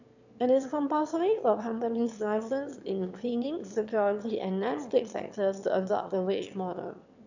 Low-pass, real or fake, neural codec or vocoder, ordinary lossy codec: 7.2 kHz; fake; autoencoder, 22.05 kHz, a latent of 192 numbers a frame, VITS, trained on one speaker; none